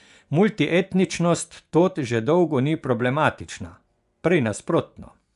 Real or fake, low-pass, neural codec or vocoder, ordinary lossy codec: fake; 10.8 kHz; vocoder, 24 kHz, 100 mel bands, Vocos; none